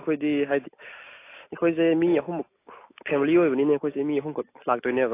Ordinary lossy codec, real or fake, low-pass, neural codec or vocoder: AAC, 24 kbps; real; 3.6 kHz; none